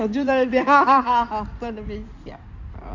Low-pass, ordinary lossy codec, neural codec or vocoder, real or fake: 7.2 kHz; MP3, 64 kbps; codec, 16 kHz in and 24 kHz out, 2.2 kbps, FireRedTTS-2 codec; fake